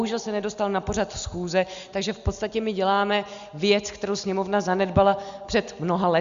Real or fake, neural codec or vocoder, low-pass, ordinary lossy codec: real; none; 7.2 kHz; Opus, 64 kbps